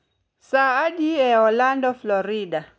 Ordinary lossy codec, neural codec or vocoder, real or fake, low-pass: none; none; real; none